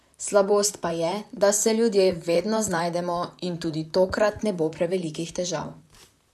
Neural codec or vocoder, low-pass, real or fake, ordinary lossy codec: vocoder, 44.1 kHz, 128 mel bands, Pupu-Vocoder; 14.4 kHz; fake; none